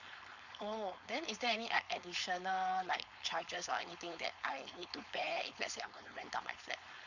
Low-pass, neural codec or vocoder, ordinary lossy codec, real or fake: 7.2 kHz; codec, 16 kHz, 4.8 kbps, FACodec; none; fake